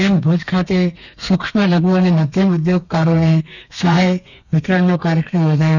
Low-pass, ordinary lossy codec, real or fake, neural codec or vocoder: 7.2 kHz; none; fake; codec, 32 kHz, 1.9 kbps, SNAC